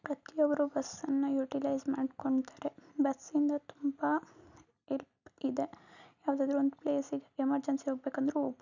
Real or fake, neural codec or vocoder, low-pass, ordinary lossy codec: real; none; 7.2 kHz; none